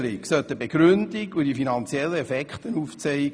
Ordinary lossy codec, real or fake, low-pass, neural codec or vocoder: none; real; none; none